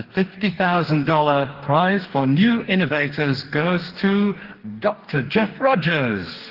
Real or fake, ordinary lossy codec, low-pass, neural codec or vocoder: fake; Opus, 16 kbps; 5.4 kHz; codec, 44.1 kHz, 2.6 kbps, DAC